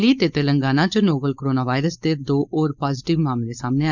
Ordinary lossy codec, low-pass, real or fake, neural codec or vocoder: none; 7.2 kHz; fake; codec, 16 kHz, 4.8 kbps, FACodec